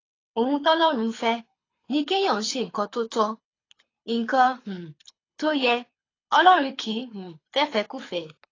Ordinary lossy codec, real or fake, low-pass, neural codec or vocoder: AAC, 32 kbps; fake; 7.2 kHz; codec, 24 kHz, 6 kbps, HILCodec